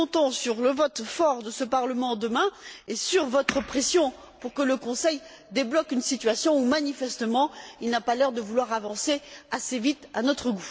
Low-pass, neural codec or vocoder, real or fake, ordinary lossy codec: none; none; real; none